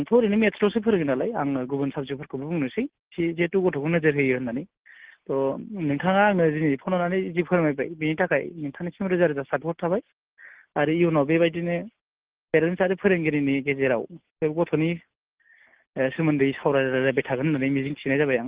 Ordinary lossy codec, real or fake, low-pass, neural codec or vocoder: Opus, 16 kbps; real; 3.6 kHz; none